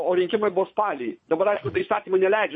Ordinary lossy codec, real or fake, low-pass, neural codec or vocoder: MP3, 32 kbps; fake; 9.9 kHz; vocoder, 22.05 kHz, 80 mel bands, Vocos